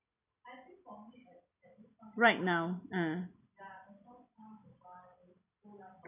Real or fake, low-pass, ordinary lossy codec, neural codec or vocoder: real; 3.6 kHz; none; none